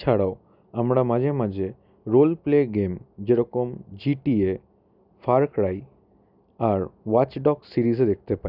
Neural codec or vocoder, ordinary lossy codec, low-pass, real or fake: none; none; 5.4 kHz; real